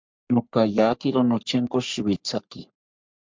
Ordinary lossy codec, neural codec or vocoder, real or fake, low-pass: MP3, 64 kbps; codec, 44.1 kHz, 3.4 kbps, Pupu-Codec; fake; 7.2 kHz